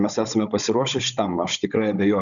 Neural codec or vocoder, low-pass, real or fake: codec, 16 kHz, 16 kbps, FunCodec, trained on Chinese and English, 50 frames a second; 7.2 kHz; fake